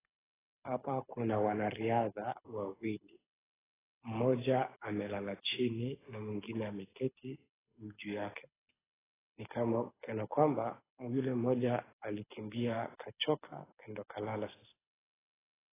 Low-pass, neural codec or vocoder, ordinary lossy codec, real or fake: 3.6 kHz; codec, 24 kHz, 6 kbps, HILCodec; AAC, 16 kbps; fake